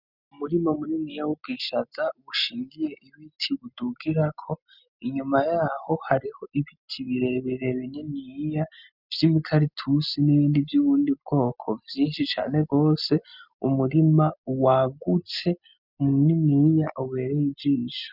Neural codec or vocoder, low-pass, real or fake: none; 5.4 kHz; real